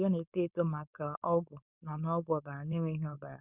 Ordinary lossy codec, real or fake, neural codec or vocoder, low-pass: none; fake; codec, 16 kHz, 4.8 kbps, FACodec; 3.6 kHz